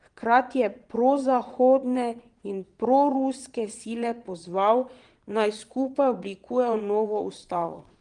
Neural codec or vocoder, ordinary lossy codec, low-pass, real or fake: vocoder, 22.05 kHz, 80 mel bands, Vocos; Opus, 24 kbps; 9.9 kHz; fake